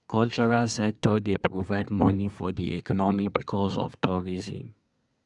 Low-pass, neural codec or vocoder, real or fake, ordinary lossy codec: 10.8 kHz; codec, 24 kHz, 1 kbps, SNAC; fake; none